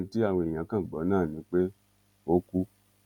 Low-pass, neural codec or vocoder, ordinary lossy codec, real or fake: 19.8 kHz; none; none; real